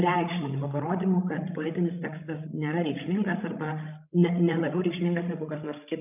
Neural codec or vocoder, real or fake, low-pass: codec, 16 kHz, 16 kbps, FreqCodec, larger model; fake; 3.6 kHz